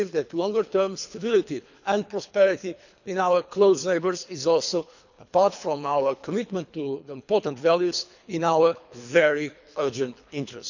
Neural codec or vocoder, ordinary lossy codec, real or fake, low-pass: codec, 24 kHz, 3 kbps, HILCodec; none; fake; 7.2 kHz